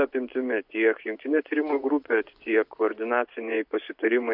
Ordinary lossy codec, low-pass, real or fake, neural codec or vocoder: MP3, 48 kbps; 5.4 kHz; fake; vocoder, 24 kHz, 100 mel bands, Vocos